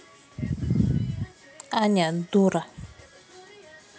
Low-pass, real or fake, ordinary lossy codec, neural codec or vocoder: none; real; none; none